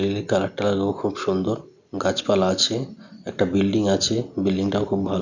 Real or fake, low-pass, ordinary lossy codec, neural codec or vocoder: real; 7.2 kHz; none; none